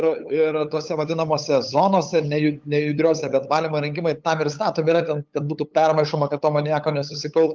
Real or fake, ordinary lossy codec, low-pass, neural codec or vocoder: fake; Opus, 32 kbps; 7.2 kHz; codec, 16 kHz, 8 kbps, FunCodec, trained on LibriTTS, 25 frames a second